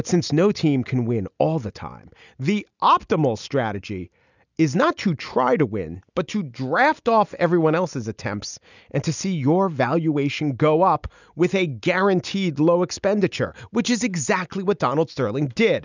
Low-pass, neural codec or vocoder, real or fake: 7.2 kHz; none; real